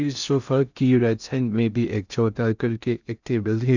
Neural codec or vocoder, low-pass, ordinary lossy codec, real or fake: codec, 16 kHz in and 24 kHz out, 0.6 kbps, FocalCodec, streaming, 2048 codes; 7.2 kHz; none; fake